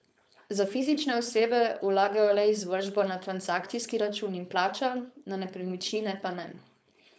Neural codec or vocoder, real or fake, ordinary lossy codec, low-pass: codec, 16 kHz, 4.8 kbps, FACodec; fake; none; none